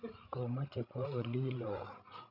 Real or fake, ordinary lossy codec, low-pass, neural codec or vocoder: fake; none; 5.4 kHz; codec, 16 kHz, 16 kbps, FreqCodec, larger model